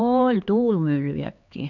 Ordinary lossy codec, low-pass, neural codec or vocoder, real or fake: none; 7.2 kHz; codec, 16 kHz, 4 kbps, X-Codec, HuBERT features, trained on LibriSpeech; fake